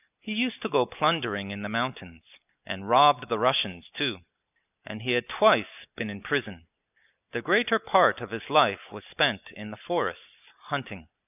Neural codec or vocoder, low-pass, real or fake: none; 3.6 kHz; real